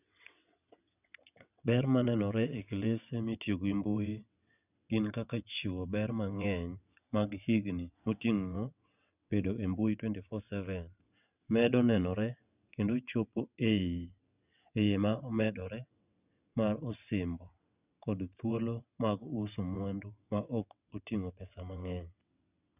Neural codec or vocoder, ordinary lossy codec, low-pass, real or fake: vocoder, 22.05 kHz, 80 mel bands, WaveNeXt; none; 3.6 kHz; fake